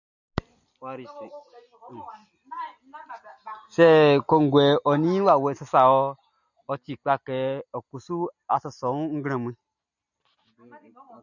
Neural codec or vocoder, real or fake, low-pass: none; real; 7.2 kHz